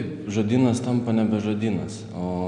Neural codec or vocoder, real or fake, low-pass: none; real; 9.9 kHz